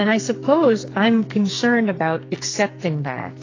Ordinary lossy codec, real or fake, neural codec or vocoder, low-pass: AAC, 32 kbps; fake; codec, 44.1 kHz, 2.6 kbps, SNAC; 7.2 kHz